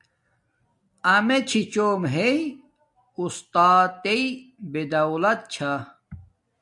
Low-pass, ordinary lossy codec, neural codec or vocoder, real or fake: 10.8 kHz; AAC, 64 kbps; none; real